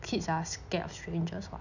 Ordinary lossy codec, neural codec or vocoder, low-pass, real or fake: none; none; 7.2 kHz; real